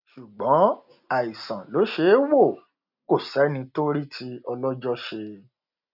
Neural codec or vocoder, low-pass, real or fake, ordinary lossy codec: none; 5.4 kHz; real; none